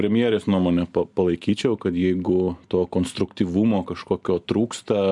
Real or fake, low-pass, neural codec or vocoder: real; 10.8 kHz; none